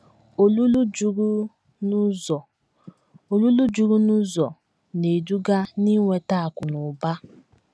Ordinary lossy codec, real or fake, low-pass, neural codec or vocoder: none; real; none; none